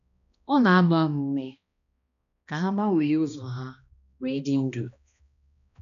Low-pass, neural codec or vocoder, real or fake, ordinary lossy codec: 7.2 kHz; codec, 16 kHz, 1 kbps, X-Codec, HuBERT features, trained on balanced general audio; fake; none